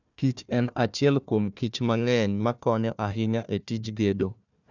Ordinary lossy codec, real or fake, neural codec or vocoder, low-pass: none; fake; codec, 16 kHz, 1 kbps, FunCodec, trained on Chinese and English, 50 frames a second; 7.2 kHz